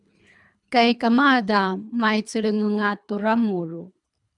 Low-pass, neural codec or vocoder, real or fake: 10.8 kHz; codec, 24 kHz, 3 kbps, HILCodec; fake